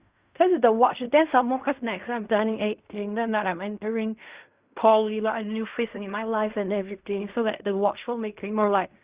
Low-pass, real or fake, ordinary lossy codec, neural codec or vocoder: 3.6 kHz; fake; Opus, 64 kbps; codec, 16 kHz in and 24 kHz out, 0.4 kbps, LongCat-Audio-Codec, fine tuned four codebook decoder